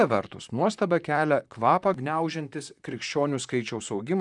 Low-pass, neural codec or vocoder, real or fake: 10.8 kHz; vocoder, 44.1 kHz, 128 mel bands, Pupu-Vocoder; fake